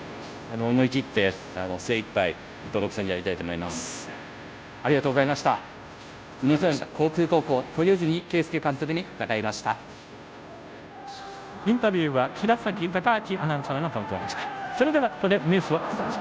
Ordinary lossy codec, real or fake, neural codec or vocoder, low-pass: none; fake; codec, 16 kHz, 0.5 kbps, FunCodec, trained on Chinese and English, 25 frames a second; none